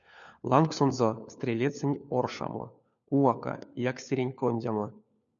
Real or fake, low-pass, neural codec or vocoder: fake; 7.2 kHz; codec, 16 kHz, 4 kbps, FunCodec, trained on LibriTTS, 50 frames a second